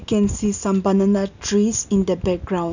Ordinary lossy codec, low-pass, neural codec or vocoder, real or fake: none; 7.2 kHz; none; real